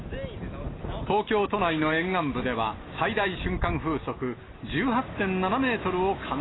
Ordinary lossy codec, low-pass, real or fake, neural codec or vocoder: AAC, 16 kbps; 7.2 kHz; real; none